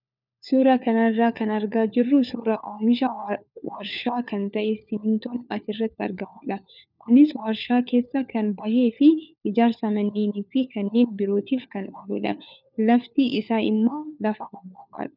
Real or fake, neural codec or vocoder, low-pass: fake; codec, 16 kHz, 4 kbps, FunCodec, trained on LibriTTS, 50 frames a second; 5.4 kHz